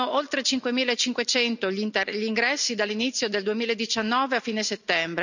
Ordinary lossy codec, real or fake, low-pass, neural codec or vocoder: none; real; 7.2 kHz; none